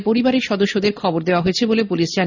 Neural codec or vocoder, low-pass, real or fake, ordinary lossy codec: none; 7.2 kHz; real; none